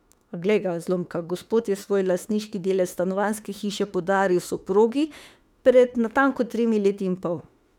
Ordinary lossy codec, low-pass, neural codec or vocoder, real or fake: none; 19.8 kHz; autoencoder, 48 kHz, 32 numbers a frame, DAC-VAE, trained on Japanese speech; fake